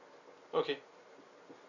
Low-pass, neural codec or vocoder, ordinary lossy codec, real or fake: 7.2 kHz; none; none; real